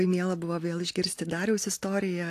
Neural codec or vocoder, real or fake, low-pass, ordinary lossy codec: none; real; 14.4 kHz; AAC, 64 kbps